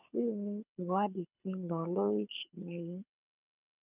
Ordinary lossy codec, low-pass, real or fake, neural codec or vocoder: none; 3.6 kHz; fake; codec, 24 kHz, 6 kbps, HILCodec